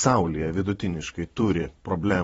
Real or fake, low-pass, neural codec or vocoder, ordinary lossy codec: fake; 19.8 kHz; vocoder, 44.1 kHz, 128 mel bands every 512 samples, BigVGAN v2; AAC, 24 kbps